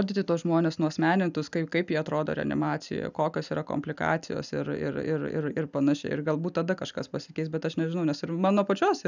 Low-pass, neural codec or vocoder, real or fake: 7.2 kHz; none; real